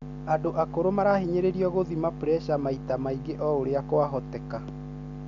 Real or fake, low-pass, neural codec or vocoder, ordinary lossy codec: real; 7.2 kHz; none; none